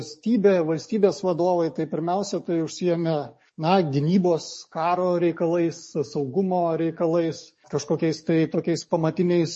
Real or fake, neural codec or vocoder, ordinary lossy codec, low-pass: fake; codec, 44.1 kHz, 7.8 kbps, DAC; MP3, 32 kbps; 10.8 kHz